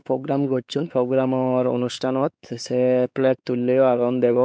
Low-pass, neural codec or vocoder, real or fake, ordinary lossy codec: none; codec, 16 kHz, 4 kbps, X-Codec, WavLM features, trained on Multilingual LibriSpeech; fake; none